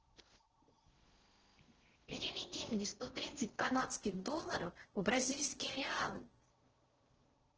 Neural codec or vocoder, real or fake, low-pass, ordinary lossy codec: codec, 16 kHz in and 24 kHz out, 0.6 kbps, FocalCodec, streaming, 4096 codes; fake; 7.2 kHz; Opus, 16 kbps